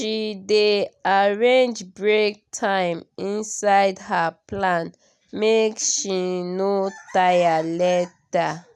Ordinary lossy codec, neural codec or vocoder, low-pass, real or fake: none; none; none; real